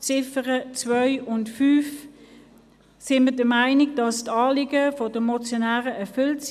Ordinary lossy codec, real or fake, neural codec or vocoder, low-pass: none; real; none; 14.4 kHz